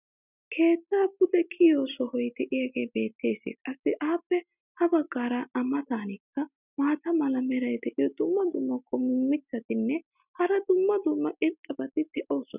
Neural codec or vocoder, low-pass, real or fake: none; 3.6 kHz; real